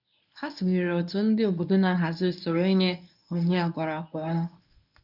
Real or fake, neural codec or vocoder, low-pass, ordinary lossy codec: fake; codec, 24 kHz, 0.9 kbps, WavTokenizer, medium speech release version 1; 5.4 kHz; none